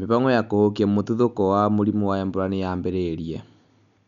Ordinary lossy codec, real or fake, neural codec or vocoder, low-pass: none; real; none; 7.2 kHz